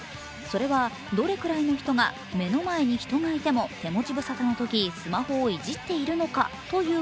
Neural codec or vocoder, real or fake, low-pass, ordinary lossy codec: none; real; none; none